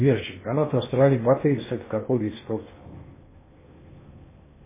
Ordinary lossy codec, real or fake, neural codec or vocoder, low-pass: MP3, 16 kbps; fake; codec, 16 kHz in and 24 kHz out, 0.8 kbps, FocalCodec, streaming, 65536 codes; 3.6 kHz